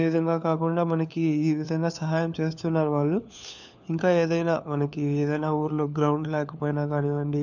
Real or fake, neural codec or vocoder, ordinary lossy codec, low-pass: fake; codec, 16 kHz, 4 kbps, FunCodec, trained on LibriTTS, 50 frames a second; none; 7.2 kHz